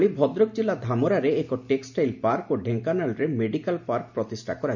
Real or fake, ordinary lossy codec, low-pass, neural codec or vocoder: real; none; 7.2 kHz; none